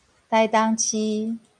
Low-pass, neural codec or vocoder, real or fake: 9.9 kHz; none; real